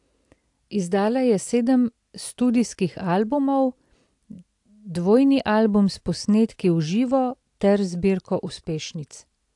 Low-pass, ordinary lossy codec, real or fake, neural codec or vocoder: 10.8 kHz; AAC, 64 kbps; real; none